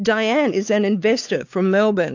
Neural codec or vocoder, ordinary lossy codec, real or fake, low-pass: codec, 16 kHz, 4 kbps, X-Codec, WavLM features, trained on Multilingual LibriSpeech; AAC, 48 kbps; fake; 7.2 kHz